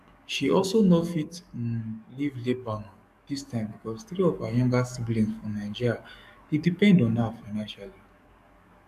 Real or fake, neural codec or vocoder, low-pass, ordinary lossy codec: fake; codec, 44.1 kHz, 7.8 kbps, DAC; 14.4 kHz; MP3, 96 kbps